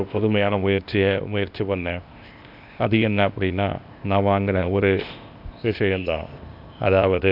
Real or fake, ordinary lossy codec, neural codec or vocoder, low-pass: fake; none; codec, 16 kHz, 0.8 kbps, ZipCodec; 5.4 kHz